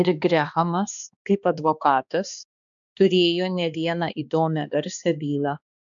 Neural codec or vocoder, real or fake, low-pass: codec, 16 kHz, 2 kbps, X-Codec, HuBERT features, trained on balanced general audio; fake; 7.2 kHz